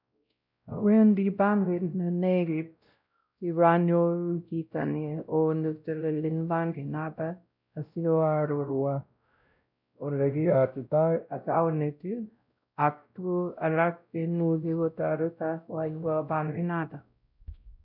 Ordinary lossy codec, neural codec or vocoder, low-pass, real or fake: none; codec, 16 kHz, 0.5 kbps, X-Codec, WavLM features, trained on Multilingual LibriSpeech; 5.4 kHz; fake